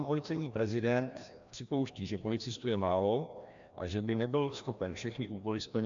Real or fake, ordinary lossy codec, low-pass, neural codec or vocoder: fake; AAC, 64 kbps; 7.2 kHz; codec, 16 kHz, 1 kbps, FreqCodec, larger model